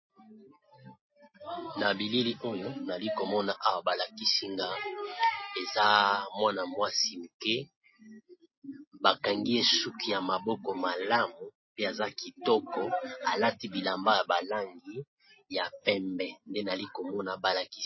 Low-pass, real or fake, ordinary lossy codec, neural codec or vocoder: 7.2 kHz; real; MP3, 24 kbps; none